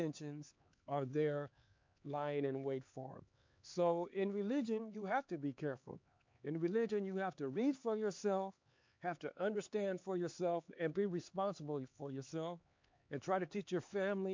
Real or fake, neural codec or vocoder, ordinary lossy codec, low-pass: fake; codec, 16 kHz, 4 kbps, X-Codec, HuBERT features, trained on LibriSpeech; MP3, 48 kbps; 7.2 kHz